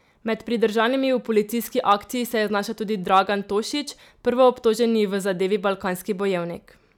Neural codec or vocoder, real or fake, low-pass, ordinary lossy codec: none; real; 19.8 kHz; none